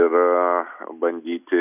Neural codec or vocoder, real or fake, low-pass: none; real; 3.6 kHz